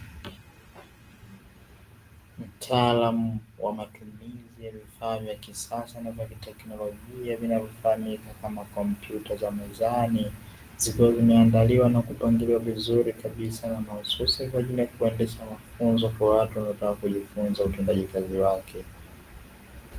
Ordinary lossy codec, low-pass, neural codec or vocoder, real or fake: Opus, 24 kbps; 14.4 kHz; none; real